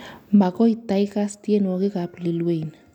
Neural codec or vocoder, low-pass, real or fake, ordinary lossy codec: none; 19.8 kHz; real; none